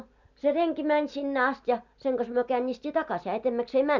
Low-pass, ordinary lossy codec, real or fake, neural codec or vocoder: 7.2 kHz; none; real; none